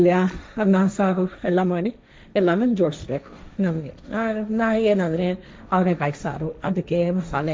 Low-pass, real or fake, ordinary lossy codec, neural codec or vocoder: none; fake; none; codec, 16 kHz, 1.1 kbps, Voila-Tokenizer